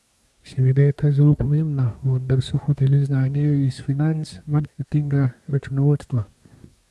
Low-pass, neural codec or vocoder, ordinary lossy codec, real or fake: none; codec, 24 kHz, 1 kbps, SNAC; none; fake